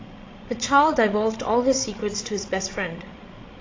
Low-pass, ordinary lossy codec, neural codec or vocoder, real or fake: 7.2 kHz; AAC, 32 kbps; codec, 16 kHz, 16 kbps, FreqCodec, larger model; fake